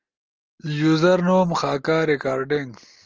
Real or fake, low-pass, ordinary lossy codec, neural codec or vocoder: real; 7.2 kHz; Opus, 24 kbps; none